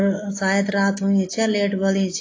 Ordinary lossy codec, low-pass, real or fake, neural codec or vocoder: AAC, 32 kbps; 7.2 kHz; real; none